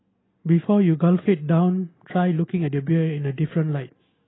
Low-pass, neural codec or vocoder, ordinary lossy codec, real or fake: 7.2 kHz; none; AAC, 16 kbps; real